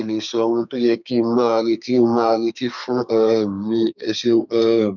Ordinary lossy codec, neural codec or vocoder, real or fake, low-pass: none; codec, 32 kHz, 1.9 kbps, SNAC; fake; 7.2 kHz